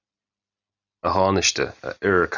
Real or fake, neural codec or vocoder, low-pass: real; none; 7.2 kHz